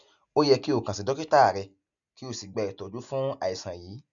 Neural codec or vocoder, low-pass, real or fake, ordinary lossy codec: none; 7.2 kHz; real; none